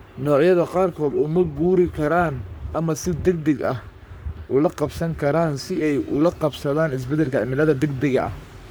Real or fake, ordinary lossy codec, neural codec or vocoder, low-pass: fake; none; codec, 44.1 kHz, 3.4 kbps, Pupu-Codec; none